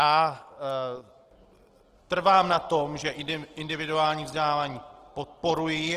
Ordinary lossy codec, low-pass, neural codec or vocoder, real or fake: Opus, 16 kbps; 14.4 kHz; none; real